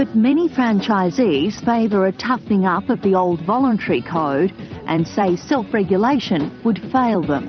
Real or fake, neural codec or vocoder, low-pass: fake; vocoder, 44.1 kHz, 128 mel bands every 256 samples, BigVGAN v2; 7.2 kHz